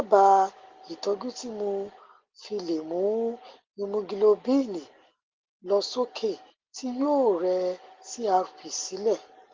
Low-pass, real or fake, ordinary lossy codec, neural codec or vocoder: 7.2 kHz; real; Opus, 16 kbps; none